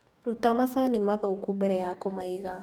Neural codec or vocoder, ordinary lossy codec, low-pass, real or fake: codec, 44.1 kHz, 2.6 kbps, DAC; none; none; fake